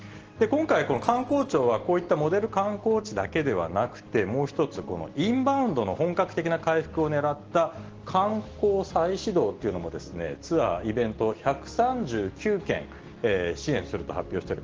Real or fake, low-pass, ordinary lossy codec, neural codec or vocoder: real; 7.2 kHz; Opus, 16 kbps; none